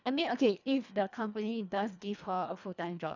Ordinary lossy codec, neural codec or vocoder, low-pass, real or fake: none; codec, 24 kHz, 1.5 kbps, HILCodec; 7.2 kHz; fake